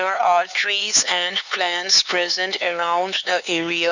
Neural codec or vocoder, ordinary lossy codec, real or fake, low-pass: codec, 16 kHz, 4 kbps, X-Codec, HuBERT features, trained on LibriSpeech; none; fake; 7.2 kHz